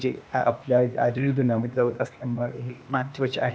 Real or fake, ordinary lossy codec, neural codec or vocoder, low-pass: fake; none; codec, 16 kHz, 0.8 kbps, ZipCodec; none